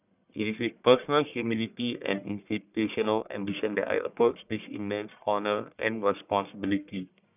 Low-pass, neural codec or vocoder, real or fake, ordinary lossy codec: 3.6 kHz; codec, 44.1 kHz, 1.7 kbps, Pupu-Codec; fake; none